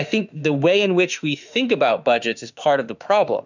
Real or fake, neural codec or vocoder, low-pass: fake; autoencoder, 48 kHz, 32 numbers a frame, DAC-VAE, trained on Japanese speech; 7.2 kHz